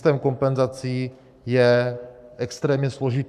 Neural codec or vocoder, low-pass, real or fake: codec, 44.1 kHz, 7.8 kbps, Pupu-Codec; 14.4 kHz; fake